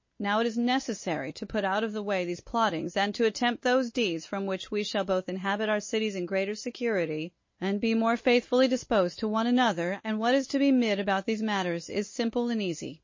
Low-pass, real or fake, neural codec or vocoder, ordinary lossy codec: 7.2 kHz; real; none; MP3, 32 kbps